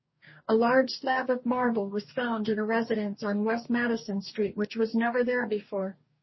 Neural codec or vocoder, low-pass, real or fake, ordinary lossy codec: codec, 44.1 kHz, 2.6 kbps, DAC; 7.2 kHz; fake; MP3, 24 kbps